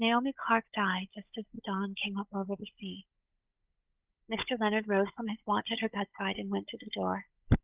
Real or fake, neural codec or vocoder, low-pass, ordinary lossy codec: fake; codec, 16 kHz, 16 kbps, FunCodec, trained on Chinese and English, 50 frames a second; 3.6 kHz; Opus, 24 kbps